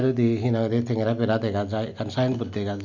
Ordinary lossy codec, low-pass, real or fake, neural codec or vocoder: none; 7.2 kHz; real; none